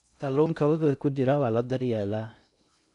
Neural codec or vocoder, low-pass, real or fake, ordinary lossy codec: codec, 16 kHz in and 24 kHz out, 0.6 kbps, FocalCodec, streaming, 2048 codes; 10.8 kHz; fake; none